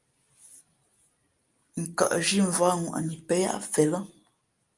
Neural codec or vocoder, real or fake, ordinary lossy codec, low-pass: none; real; Opus, 32 kbps; 10.8 kHz